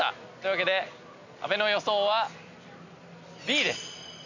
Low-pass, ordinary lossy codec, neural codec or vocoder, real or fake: 7.2 kHz; none; none; real